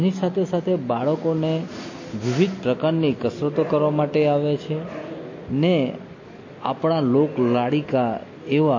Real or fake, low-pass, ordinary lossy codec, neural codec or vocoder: real; 7.2 kHz; MP3, 32 kbps; none